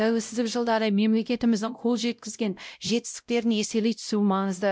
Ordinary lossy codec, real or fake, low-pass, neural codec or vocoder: none; fake; none; codec, 16 kHz, 0.5 kbps, X-Codec, WavLM features, trained on Multilingual LibriSpeech